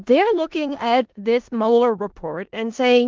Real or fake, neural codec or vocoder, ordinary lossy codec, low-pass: fake; codec, 16 kHz in and 24 kHz out, 0.4 kbps, LongCat-Audio-Codec, four codebook decoder; Opus, 32 kbps; 7.2 kHz